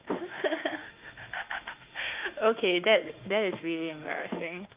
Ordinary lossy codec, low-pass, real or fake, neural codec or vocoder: Opus, 24 kbps; 3.6 kHz; fake; autoencoder, 48 kHz, 32 numbers a frame, DAC-VAE, trained on Japanese speech